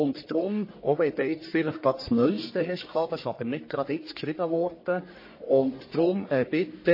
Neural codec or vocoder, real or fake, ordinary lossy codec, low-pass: codec, 44.1 kHz, 1.7 kbps, Pupu-Codec; fake; MP3, 24 kbps; 5.4 kHz